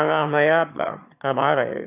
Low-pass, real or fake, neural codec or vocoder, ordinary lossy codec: 3.6 kHz; fake; autoencoder, 22.05 kHz, a latent of 192 numbers a frame, VITS, trained on one speaker; none